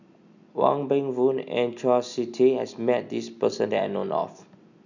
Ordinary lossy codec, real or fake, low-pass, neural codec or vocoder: none; real; 7.2 kHz; none